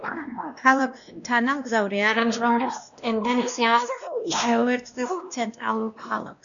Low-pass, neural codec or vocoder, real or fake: 7.2 kHz; codec, 16 kHz, 1 kbps, X-Codec, WavLM features, trained on Multilingual LibriSpeech; fake